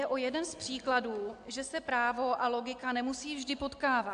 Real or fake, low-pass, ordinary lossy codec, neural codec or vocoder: real; 9.9 kHz; AAC, 64 kbps; none